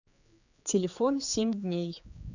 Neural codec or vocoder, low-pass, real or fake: codec, 16 kHz, 4 kbps, X-Codec, HuBERT features, trained on general audio; 7.2 kHz; fake